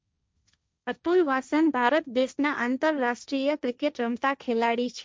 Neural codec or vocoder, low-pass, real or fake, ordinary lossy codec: codec, 16 kHz, 1.1 kbps, Voila-Tokenizer; none; fake; none